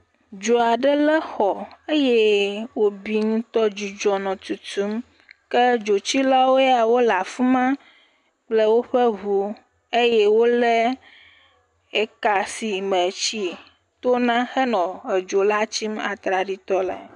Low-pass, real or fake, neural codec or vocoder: 10.8 kHz; real; none